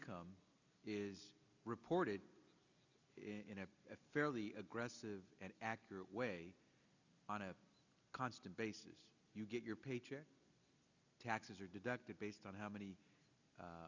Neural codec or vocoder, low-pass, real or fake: none; 7.2 kHz; real